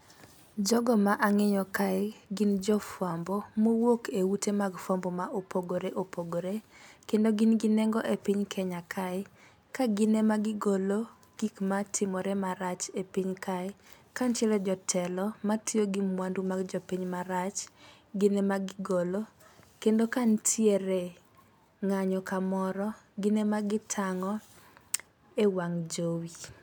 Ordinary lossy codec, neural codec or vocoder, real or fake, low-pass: none; none; real; none